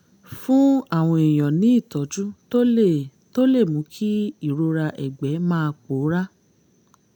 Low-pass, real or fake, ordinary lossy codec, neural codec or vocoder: 19.8 kHz; real; none; none